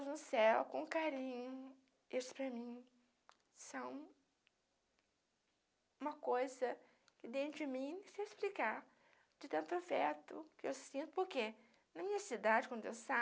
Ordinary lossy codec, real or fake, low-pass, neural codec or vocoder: none; real; none; none